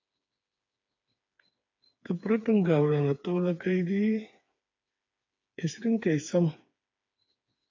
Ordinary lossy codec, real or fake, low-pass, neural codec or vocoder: AAC, 48 kbps; fake; 7.2 kHz; codec, 16 kHz, 4 kbps, FreqCodec, smaller model